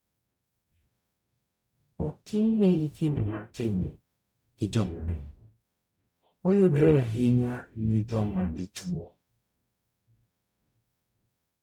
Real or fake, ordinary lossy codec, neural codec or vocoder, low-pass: fake; none; codec, 44.1 kHz, 0.9 kbps, DAC; 19.8 kHz